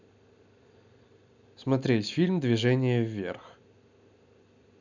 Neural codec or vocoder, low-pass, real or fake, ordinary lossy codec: none; 7.2 kHz; real; none